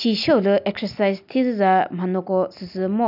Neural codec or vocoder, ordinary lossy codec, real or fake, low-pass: none; none; real; 5.4 kHz